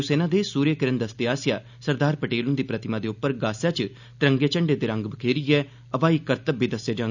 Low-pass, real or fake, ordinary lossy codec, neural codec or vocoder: 7.2 kHz; real; none; none